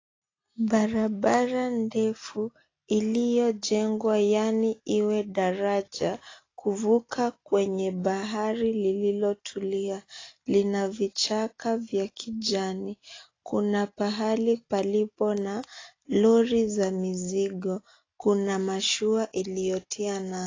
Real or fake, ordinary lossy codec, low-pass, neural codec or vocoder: real; AAC, 32 kbps; 7.2 kHz; none